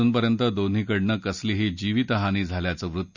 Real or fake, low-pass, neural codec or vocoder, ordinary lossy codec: real; 7.2 kHz; none; none